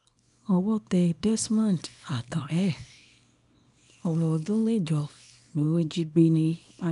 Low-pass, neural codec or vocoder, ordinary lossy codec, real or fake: 10.8 kHz; codec, 24 kHz, 0.9 kbps, WavTokenizer, small release; none; fake